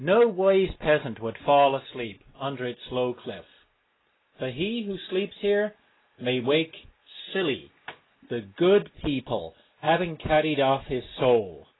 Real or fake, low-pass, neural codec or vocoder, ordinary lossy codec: real; 7.2 kHz; none; AAC, 16 kbps